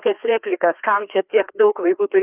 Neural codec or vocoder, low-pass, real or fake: codec, 16 kHz, 2 kbps, FreqCodec, larger model; 3.6 kHz; fake